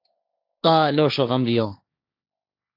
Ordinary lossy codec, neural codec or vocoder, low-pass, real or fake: AAC, 32 kbps; codec, 16 kHz, 1.1 kbps, Voila-Tokenizer; 5.4 kHz; fake